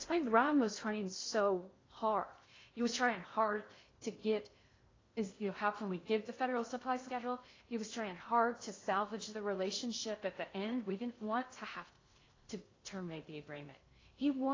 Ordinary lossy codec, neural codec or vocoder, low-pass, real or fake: AAC, 32 kbps; codec, 16 kHz in and 24 kHz out, 0.6 kbps, FocalCodec, streaming, 2048 codes; 7.2 kHz; fake